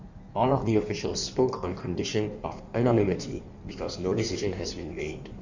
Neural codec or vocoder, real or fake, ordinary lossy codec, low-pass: codec, 16 kHz in and 24 kHz out, 1.1 kbps, FireRedTTS-2 codec; fake; none; 7.2 kHz